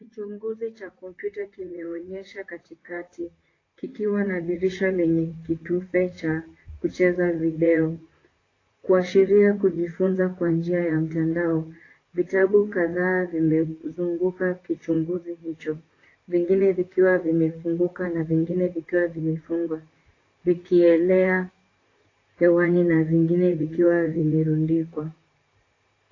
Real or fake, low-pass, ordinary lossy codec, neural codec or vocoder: fake; 7.2 kHz; AAC, 32 kbps; vocoder, 44.1 kHz, 128 mel bands, Pupu-Vocoder